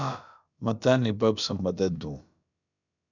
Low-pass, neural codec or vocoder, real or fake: 7.2 kHz; codec, 16 kHz, about 1 kbps, DyCAST, with the encoder's durations; fake